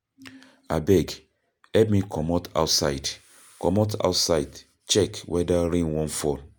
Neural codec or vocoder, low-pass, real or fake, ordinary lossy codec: none; none; real; none